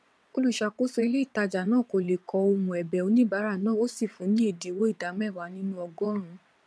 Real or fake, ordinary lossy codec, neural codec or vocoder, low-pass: fake; none; vocoder, 22.05 kHz, 80 mel bands, WaveNeXt; none